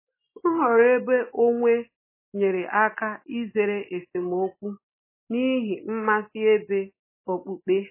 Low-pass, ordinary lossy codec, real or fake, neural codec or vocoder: 3.6 kHz; MP3, 16 kbps; real; none